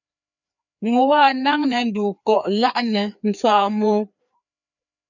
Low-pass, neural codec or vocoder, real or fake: 7.2 kHz; codec, 16 kHz, 2 kbps, FreqCodec, larger model; fake